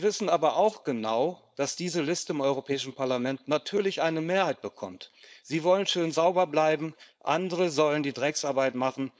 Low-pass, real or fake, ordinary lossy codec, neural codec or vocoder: none; fake; none; codec, 16 kHz, 4.8 kbps, FACodec